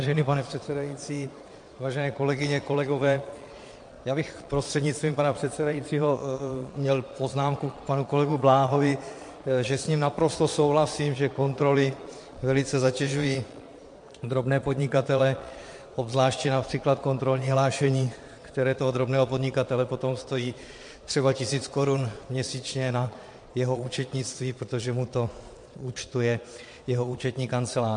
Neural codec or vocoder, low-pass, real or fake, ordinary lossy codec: vocoder, 22.05 kHz, 80 mel bands, Vocos; 9.9 kHz; fake; MP3, 48 kbps